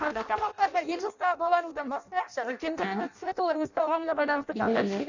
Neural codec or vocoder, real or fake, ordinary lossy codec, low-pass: codec, 16 kHz in and 24 kHz out, 0.6 kbps, FireRedTTS-2 codec; fake; none; 7.2 kHz